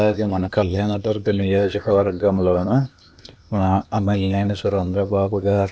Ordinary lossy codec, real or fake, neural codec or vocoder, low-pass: none; fake; codec, 16 kHz, 0.8 kbps, ZipCodec; none